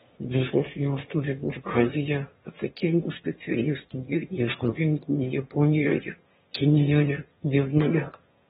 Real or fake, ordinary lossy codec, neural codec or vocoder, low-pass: fake; AAC, 16 kbps; autoencoder, 22.05 kHz, a latent of 192 numbers a frame, VITS, trained on one speaker; 9.9 kHz